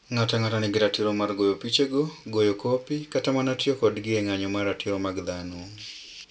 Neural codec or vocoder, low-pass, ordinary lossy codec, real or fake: none; none; none; real